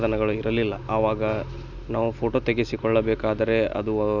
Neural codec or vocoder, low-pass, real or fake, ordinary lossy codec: none; 7.2 kHz; real; none